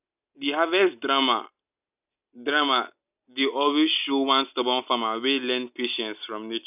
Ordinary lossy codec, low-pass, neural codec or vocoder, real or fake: none; 3.6 kHz; none; real